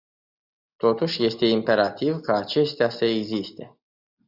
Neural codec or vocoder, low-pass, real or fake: none; 5.4 kHz; real